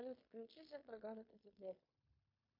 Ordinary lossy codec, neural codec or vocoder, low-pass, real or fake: MP3, 32 kbps; codec, 16 kHz in and 24 kHz out, 0.9 kbps, LongCat-Audio-Codec, fine tuned four codebook decoder; 5.4 kHz; fake